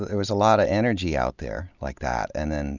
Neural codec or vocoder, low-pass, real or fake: none; 7.2 kHz; real